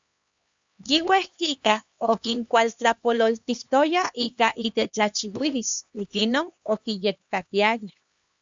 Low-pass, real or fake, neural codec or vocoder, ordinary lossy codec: 7.2 kHz; fake; codec, 16 kHz, 2 kbps, X-Codec, HuBERT features, trained on LibriSpeech; Opus, 64 kbps